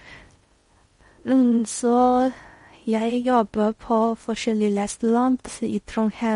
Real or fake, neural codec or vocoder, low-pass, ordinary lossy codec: fake; codec, 16 kHz in and 24 kHz out, 0.6 kbps, FocalCodec, streaming, 4096 codes; 10.8 kHz; MP3, 48 kbps